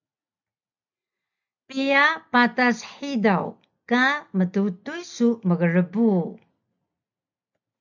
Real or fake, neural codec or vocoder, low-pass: real; none; 7.2 kHz